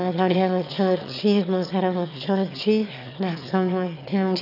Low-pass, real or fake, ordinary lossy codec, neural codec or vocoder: 5.4 kHz; fake; none; autoencoder, 22.05 kHz, a latent of 192 numbers a frame, VITS, trained on one speaker